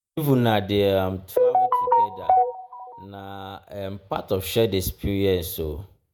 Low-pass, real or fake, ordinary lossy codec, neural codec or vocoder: none; fake; none; vocoder, 48 kHz, 128 mel bands, Vocos